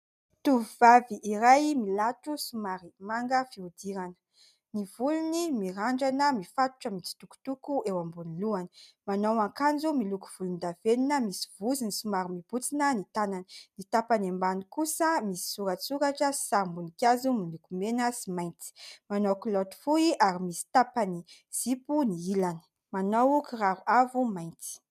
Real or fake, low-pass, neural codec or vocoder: real; 14.4 kHz; none